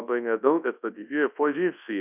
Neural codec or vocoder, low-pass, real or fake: codec, 24 kHz, 0.9 kbps, WavTokenizer, large speech release; 3.6 kHz; fake